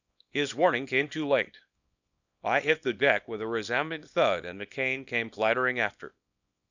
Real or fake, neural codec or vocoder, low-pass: fake; codec, 24 kHz, 0.9 kbps, WavTokenizer, small release; 7.2 kHz